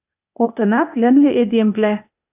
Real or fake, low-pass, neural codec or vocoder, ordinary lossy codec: fake; 3.6 kHz; codec, 16 kHz, 0.8 kbps, ZipCodec; AAC, 32 kbps